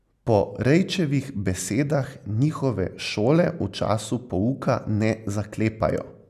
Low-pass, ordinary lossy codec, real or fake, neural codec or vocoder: 14.4 kHz; none; real; none